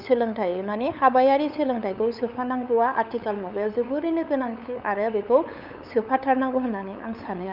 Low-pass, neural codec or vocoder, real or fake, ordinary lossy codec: 5.4 kHz; codec, 16 kHz, 8 kbps, FunCodec, trained on LibriTTS, 25 frames a second; fake; none